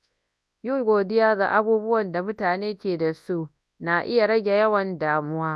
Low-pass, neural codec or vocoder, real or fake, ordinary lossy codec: none; codec, 24 kHz, 0.9 kbps, WavTokenizer, large speech release; fake; none